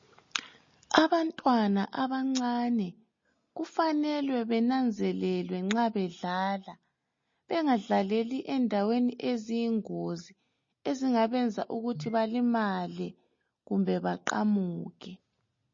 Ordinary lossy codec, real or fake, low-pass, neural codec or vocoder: MP3, 32 kbps; real; 7.2 kHz; none